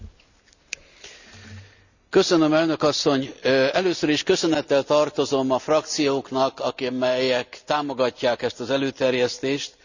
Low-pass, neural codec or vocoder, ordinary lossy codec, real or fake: 7.2 kHz; none; none; real